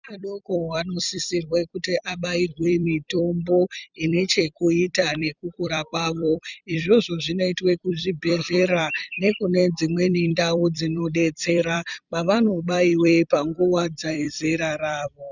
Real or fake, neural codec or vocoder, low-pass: fake; vocoder, 44.1 kHz, 128 mel bands every 256 samples, BigVGAN v2; 7.2 kHz